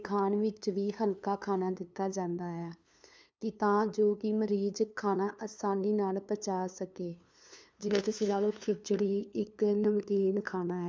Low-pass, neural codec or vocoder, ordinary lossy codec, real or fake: none; codec, 16 kHz, 2 kbps, FunCodec, trained on LibriTTS, 25 frames a second; none; fake